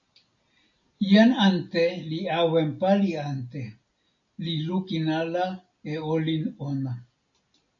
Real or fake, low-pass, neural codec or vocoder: real; 7.2 kHz; none